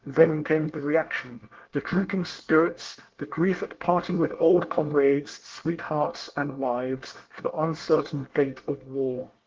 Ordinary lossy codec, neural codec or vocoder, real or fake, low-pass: Opus, 16 kbps; codec, 24 kHz, 1 kbps, SNAC; fake; 7.2 kHz